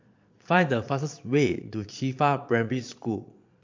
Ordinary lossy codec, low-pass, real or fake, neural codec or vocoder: MP3, 48 kbps; 7.2 kHz; fake; vocoder, 22.05 kHz, 80 mel bands, Vocos